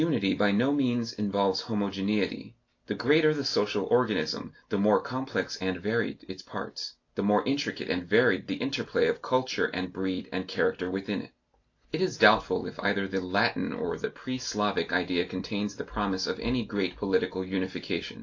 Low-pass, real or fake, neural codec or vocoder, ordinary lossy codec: 7.2 kHz; real; none; AAC, 48 kbps